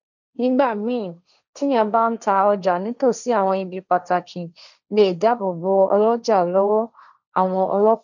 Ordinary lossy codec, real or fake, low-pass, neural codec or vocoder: none; fake; 7.2 kHz; codec, 16 kHz, 1.1 kbps, Voila-Tokenizer